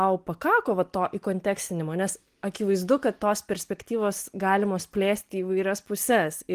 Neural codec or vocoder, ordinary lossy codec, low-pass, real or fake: none; Opus, 24 kbps; 14.4 kHz; real